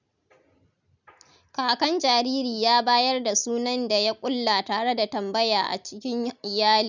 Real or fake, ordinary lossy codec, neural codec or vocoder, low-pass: real; none; none; 7.2 kHz